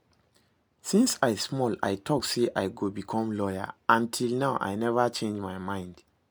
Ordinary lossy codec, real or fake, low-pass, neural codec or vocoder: none; real; none; none